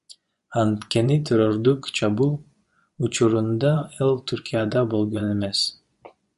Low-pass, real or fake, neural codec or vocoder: 10.8 kHz; fake; vocoder, 24 kHz, 100 mel bands, Vocos